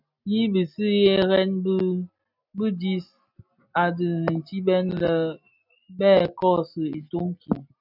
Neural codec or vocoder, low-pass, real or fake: none; 5.4 kHz; real